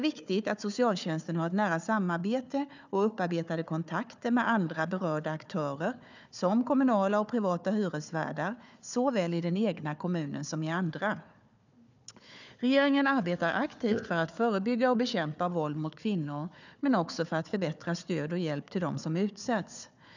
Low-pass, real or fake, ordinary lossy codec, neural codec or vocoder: 7.2 kHz; fake; none; codec, 16 kHz, 4 kbps, FunCodec, trained on Chinese and English, 50 frames a second